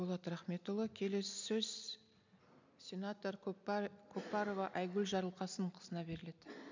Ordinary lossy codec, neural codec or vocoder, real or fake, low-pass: MP3, 64 kbps; none; real; 7.2 kHz